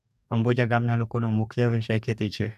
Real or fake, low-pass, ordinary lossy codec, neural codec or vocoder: fake; 14.4 kHz; none; codec, 44.1 kHz, 2.6 kbps, DAC